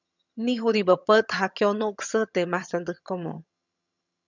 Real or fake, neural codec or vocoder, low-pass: fake; vocoder, 22.05 kHz, 80 mel bands, HiFi-GAN; 7.2 kHz